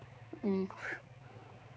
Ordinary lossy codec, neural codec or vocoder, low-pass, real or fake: none; codec, 16 kHz, 4 kbps, X-Codec, HuBERT features, trained on balanced general audio; none; fake